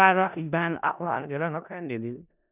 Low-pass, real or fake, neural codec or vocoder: 3.6 kHz; fake; codec, 16 kHz in and 24 kHz out, 0.4 kbps, LongCat-Audio-Codec, four codebook decoder